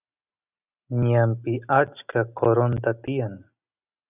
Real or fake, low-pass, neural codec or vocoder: real; 3.6 kHz; none